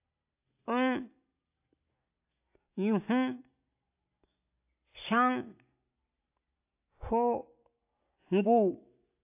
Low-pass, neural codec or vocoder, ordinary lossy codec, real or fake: 3.6 kHz; none; AAC, 32 kbps; real